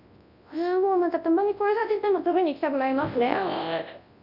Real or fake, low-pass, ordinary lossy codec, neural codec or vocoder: fake; 5.4 kHz; none; codec, 24 kHz, 0.9 kbps, WavTokenizer, large speech release